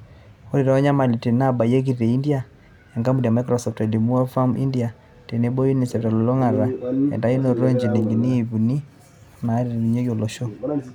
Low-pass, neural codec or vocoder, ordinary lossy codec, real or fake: 19.8 kHz; none; none; real